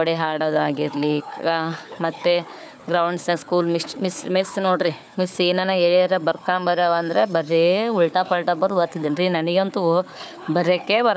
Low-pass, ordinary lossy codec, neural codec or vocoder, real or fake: none; none; codec, 16 kHz, 4 kbps, FunCodec, trained on Chinese and English, 50 frames a second; fake